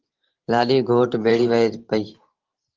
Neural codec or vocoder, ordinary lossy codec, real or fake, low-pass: vocoder, 44.1 kHz, 128 mel bands every 512 samples, BigVGAN v2; Opus, 16 kbps; fake; 7.2 kHz